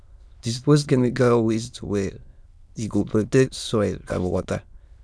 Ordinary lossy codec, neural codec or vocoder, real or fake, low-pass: none; autoencoder, 22.05 kHz, a latent of 192 numbers a frame, VITS, trained on many speakers; fake; none